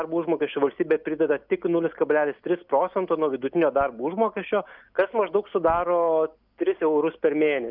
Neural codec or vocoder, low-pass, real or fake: none; 5.4 kHz; real